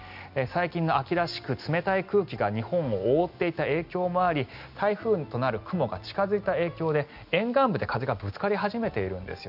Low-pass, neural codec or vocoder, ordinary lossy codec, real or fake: 5.4 kHz; none; none; real